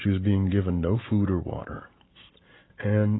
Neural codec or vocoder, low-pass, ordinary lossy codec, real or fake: none; 7.2 kHz; AAC, 16 kbps; real